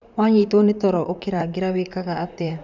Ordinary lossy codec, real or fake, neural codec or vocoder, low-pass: none; fake; vocoder, 44.1 kHz, 128 mel bands, Pupu-Vocoder; 7.2 kHz